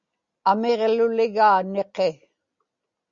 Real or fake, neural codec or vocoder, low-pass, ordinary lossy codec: real; none; 7.2 kHz; Opus, 64 kbps